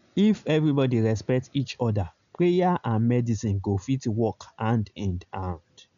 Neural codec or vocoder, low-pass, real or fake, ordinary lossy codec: none; 7.2 kHz; real; none